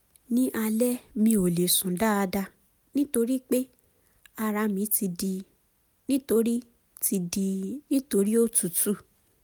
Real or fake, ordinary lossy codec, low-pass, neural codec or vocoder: real; none; none; none